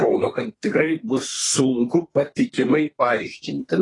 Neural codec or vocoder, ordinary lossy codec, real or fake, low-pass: codec, 24 kHz, 1 kbps, SNAC; AAC, 32 kbps; fake; 10.8 kHz